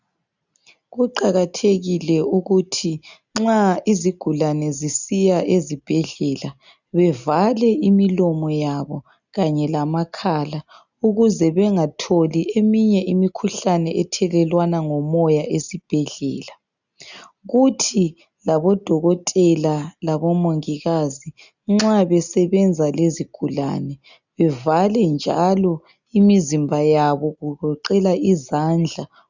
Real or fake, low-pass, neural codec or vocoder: real; 7.2 kHz; none